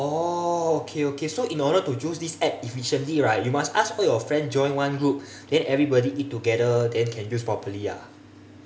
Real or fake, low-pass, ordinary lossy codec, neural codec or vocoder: real; none; none; none